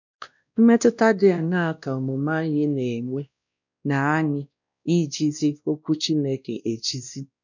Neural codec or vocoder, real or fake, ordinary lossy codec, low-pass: codec, 16 kHz, 1 kbps, X-Codec, WavLM features, trained on Multilingual LibriSpeech; fake; none; 7.2 kHz